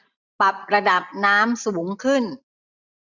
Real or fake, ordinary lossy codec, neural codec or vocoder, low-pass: fake; none; vocoder, 44.1 kHz, 128 mel bands every 256 samples, BigVGAN v2; 7.2 kHz